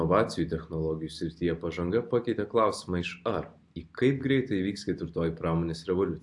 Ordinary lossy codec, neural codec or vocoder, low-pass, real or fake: AAC, 64 kbps; none; 10.8 kHz; real